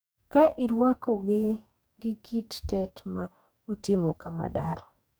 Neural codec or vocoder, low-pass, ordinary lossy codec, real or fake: codec, 44.1 kHz, 2.6 kbps, DAC; none; none; fake